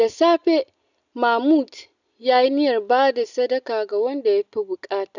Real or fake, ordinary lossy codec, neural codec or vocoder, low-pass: real; none; none; 7.2 kHz